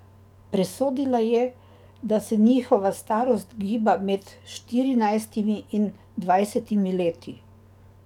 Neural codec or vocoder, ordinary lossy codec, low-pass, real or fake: codec, 44.1 kHz, 7.8 kbps, DAC; none; 19.8 kHz; fake